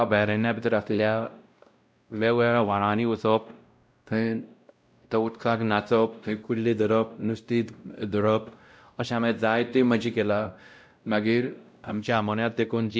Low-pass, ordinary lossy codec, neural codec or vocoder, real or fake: none; none; codec, 16 kHz, 0.5 kbps, X-Codec, WavLM features, trained on Multilingual LibriSpeech; fake